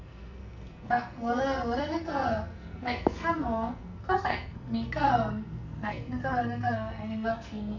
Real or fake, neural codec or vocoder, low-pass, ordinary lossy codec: fake; codec, 44.1 kHz, 2.6 kbps, SNAC; 7.2 kHz; none